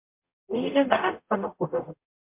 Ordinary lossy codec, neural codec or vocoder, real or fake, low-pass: MP3, 32 kbps; codec, 44.1 kHz, 0.9 kbps, DAC; fake; 3.6 kHz